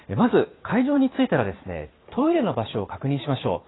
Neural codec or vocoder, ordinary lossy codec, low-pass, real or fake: vocoder, 44.1 kHz, 128 mel bands every 512 samples, BigVGAN v2; AAC, 16 kbps; 7.2 kHz; fake